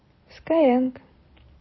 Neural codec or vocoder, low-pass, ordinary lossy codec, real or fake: vocoder, 44.1 kHz, 128 mel bands every 256 samples, BigVGAN v2; 7.2 kHz; MP3, 24 kbps; fake